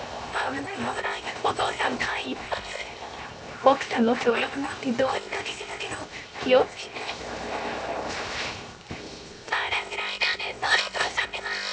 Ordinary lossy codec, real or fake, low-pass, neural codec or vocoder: none; fake; none; codec, 16 kHz, 0.7 kbps, FocalCodec